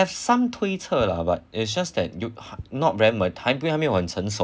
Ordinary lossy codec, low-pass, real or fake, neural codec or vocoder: none; none; real; none